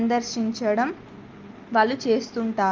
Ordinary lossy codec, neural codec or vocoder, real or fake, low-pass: Opus, 32 kbps; none; real; 7.2 kHz